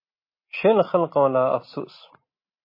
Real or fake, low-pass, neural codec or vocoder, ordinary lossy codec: real; 5.4 kHz; none; MP3, 24 kbps